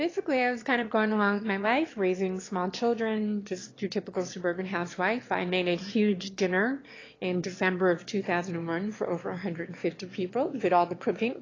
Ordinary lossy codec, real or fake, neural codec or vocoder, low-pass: AAC, 32 kbps; fake; autoencoder, 22.05 kHz, a latent of 192 numbers a frame, VITS, trained on one speaker; 7.2 kHz